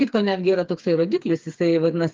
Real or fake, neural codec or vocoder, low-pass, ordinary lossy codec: fake; codec, 16 kHz, 4 kbps, FreqCodec, smaller model; 7.2 kHz; Opus, 24 kbps